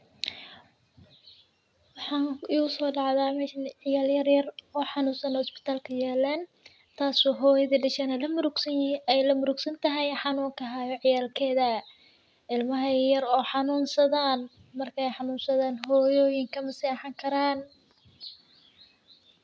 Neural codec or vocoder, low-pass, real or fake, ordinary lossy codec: none; none; real; none